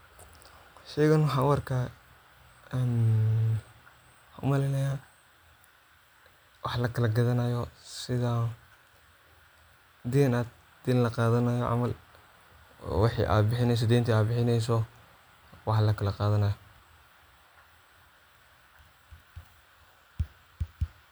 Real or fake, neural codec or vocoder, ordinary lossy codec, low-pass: real; none; none; none